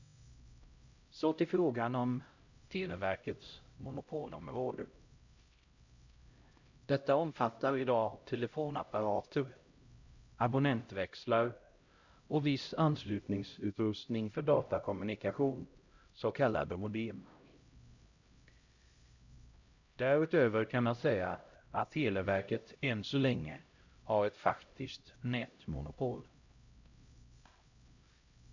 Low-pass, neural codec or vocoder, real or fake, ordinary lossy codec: 7.2 kHz; codec, 16 kHz, 0.5 kbps, X-Codec, HuBERT features, trained on LibriSpeech; fake; Opus, 64 kbps